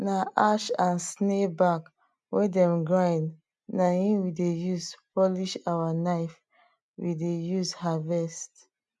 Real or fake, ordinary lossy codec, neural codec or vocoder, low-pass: real; none; none; none